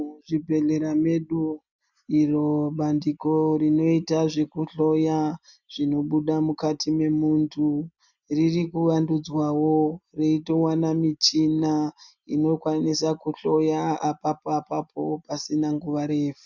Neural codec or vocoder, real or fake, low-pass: none; real; 7.2 kHz